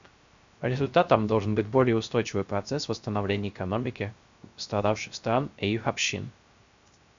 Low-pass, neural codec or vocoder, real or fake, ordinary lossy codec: 7.2 kHz; codec, 16 kHz, 0.3 kbps, FocalCodec; fake; MP3, 64 kbps